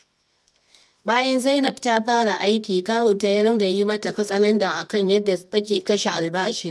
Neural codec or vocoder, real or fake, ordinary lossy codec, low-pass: codec, 24 kHz, 0.9 kbps, WavTokenizer, medium music audio release; fake; none; none